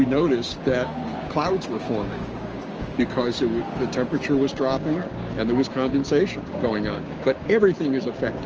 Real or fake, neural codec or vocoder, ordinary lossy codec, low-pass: fake; codec, 16 kHz, 6 kbps, DAC; Opus, 24 kbps; 7.2 kHz